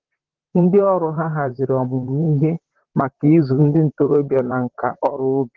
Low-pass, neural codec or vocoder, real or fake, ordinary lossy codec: 7.2 kHz; vocoder, 44.1 kHz, 128 mel bands, Pupu-Vocoder; fake; Opus, 16 kbps